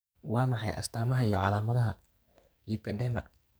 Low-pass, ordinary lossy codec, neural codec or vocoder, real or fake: none; none; codec, 44.1 kHz, 2.6 kbps, SNAC; fake